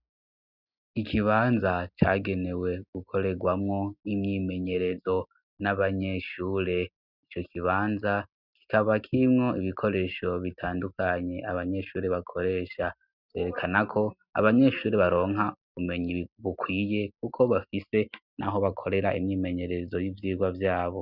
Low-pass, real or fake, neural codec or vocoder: 5.4 kHz; real; none